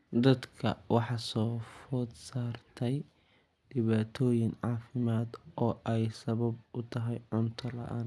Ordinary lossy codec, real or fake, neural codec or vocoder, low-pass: none; real; none; none